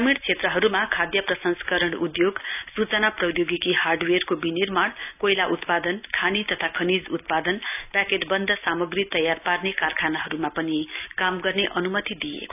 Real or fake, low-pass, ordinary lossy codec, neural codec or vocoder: real; 3.6 kHz; none; none